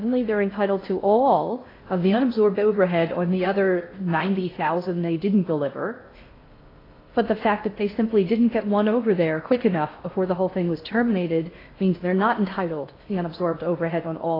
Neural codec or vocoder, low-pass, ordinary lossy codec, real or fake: codec, 16 kHz in and 24 kHz out, 0.6 kbps, FocalCodec, streaming, 2048 codes; 5.4 kHz; AAC, 24 kbps; fake